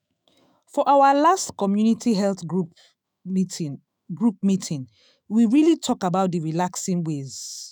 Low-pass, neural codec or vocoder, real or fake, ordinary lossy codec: none; autoencoder, 48 kHz, 128 numbers a frame, DAC-VAE, trained on Japanese speech; fake; none